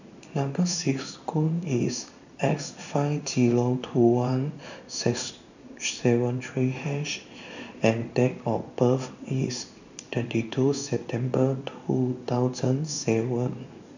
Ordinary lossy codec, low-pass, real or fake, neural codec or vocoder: none; 7.2 kHz; fake; codec, 16 kHz in and 24 kHz out, 1 kbps, XY-Tokenizer